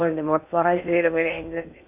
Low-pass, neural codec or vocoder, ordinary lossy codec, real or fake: 3.6 kHz; codec, 16 kHz in and 24 kHz out, 0.6 kbps, FocalCodec, streaming, 2048 codes; AAC, 32 kbps; fake